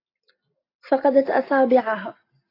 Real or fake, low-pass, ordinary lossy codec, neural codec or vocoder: real; 5.4 kHz; AAC, 32 kbps; none